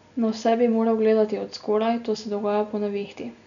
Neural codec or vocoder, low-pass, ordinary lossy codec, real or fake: none; 7.2 kHz; none; real